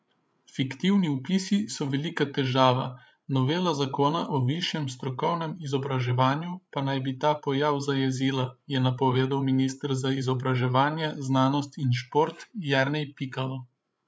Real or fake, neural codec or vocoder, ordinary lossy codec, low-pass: fake; codec, 16 kHz, 8 kbps, FreqCodec, larger model; none; none